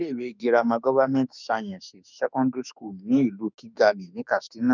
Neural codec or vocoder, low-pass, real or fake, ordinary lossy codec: autoencoder, 48 kHz, 32 numbers a frame, DAC-VAE, trained on Japanese speech; 7.2 kHz; fake; none